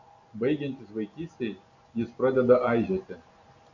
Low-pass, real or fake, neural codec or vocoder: 7.2 kHz; real; none